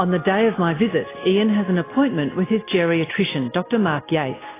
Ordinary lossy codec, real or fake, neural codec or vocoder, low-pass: AAC, 16 kbps; real; none; 3.6 kHz